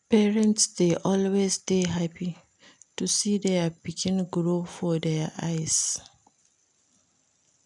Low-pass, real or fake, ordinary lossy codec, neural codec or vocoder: 10.8 kHz; real; none; none